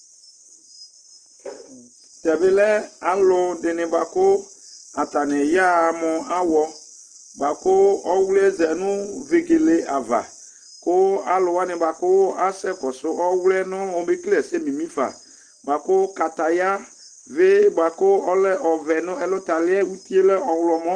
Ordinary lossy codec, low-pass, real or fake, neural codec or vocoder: Opus, 16 kbps; 9.9 kHz; real; none